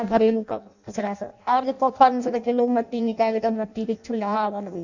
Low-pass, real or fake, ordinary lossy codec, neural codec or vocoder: 7.2 kHz; fake; none; codec, 16 kHz in and 24 kHz out, 0.6 kbps, FireRedTTS-2 codec